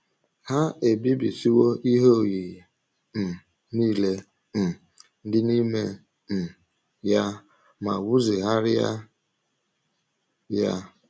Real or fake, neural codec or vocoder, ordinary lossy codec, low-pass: real; none; none; none